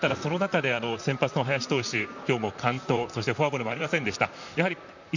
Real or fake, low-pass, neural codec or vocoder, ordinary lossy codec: fake; 7.2 kHz; vocoder, 44.1 kHz, 128 mel bands, Pupu-Vocoder; none